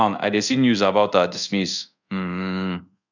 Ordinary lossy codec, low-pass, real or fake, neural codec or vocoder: none; 7.2 kHz; fake; codec, 24 kHz, 0.5 kbps, DualCodec